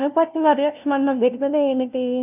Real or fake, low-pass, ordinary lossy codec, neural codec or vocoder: fake; 3.6 kHz; none; codec, 16 kHz, 0.5 kbps, FunCodec, trained on LibriTTS, 25 frames a second